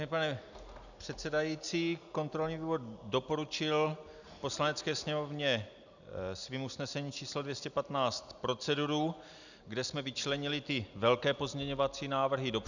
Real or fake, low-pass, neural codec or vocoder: real; 7.2 kHz; none